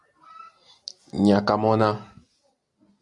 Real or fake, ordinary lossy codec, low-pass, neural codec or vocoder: real; Opus, 64 kbps; 10.8 kHz; none